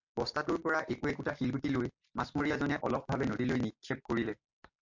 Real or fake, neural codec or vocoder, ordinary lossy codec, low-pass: real; none; MP3, 48 kbps; 7.2 kHz